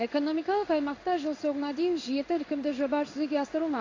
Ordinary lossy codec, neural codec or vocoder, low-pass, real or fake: AAC, 32 kbps; codec, 16 kHz in and 24 kHz out, 1 kbps, XY-Tokenizer; 7.2 kHz; fake